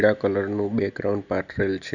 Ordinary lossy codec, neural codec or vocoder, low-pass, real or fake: none; none; 7.2 kHz; real